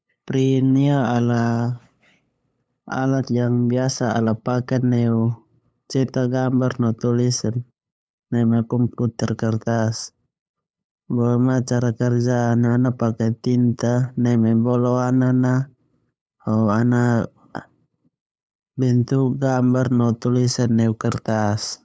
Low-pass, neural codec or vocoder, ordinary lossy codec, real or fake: none; codec, 16 kHz, 8 kbps, FunCodec, trained on LibriTTS, 25 frames a second; none; fake